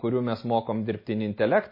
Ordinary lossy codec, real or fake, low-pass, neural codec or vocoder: MP3, 24 kbps; real; 5.4 kHz; none